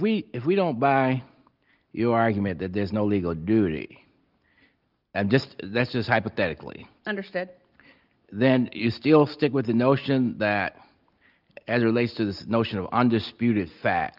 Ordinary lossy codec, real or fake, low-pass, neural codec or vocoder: Opus, 32 kbps; real; 5.4 kHz; none